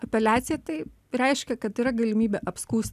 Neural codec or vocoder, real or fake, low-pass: none; real; 14.4 kHz